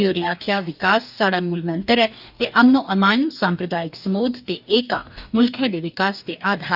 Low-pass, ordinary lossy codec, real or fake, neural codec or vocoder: 5.4 kHz; none; fake; codec, 44.1 kHz, 2.6 kbps, DAC